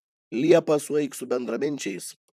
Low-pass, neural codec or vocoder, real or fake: 14.4 kHz; vocoder, 44.1 kHz, 128 mel bands, Pupu-Vocoder; fake